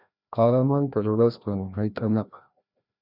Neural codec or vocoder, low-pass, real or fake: codec, 16 kHz, 1 kbps, FreqCodec, larger model; 5.4 kHz; fake